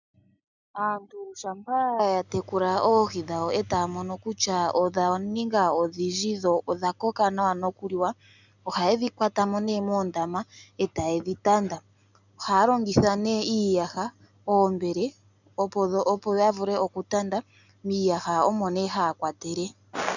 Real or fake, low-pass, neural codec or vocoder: real; 7.2 kHz; none